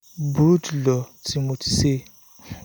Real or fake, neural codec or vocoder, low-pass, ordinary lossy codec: real; none; none; none